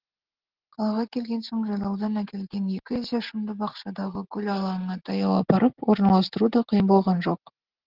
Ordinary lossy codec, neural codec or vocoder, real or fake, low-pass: Opus, 16 kbps; none; real; 5.4 kHz